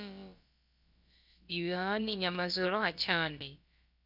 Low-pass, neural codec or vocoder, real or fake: 5.4 kHz; codec, 16 kHz, about 1 kbps, DyCAST, with the encoder's durations; fake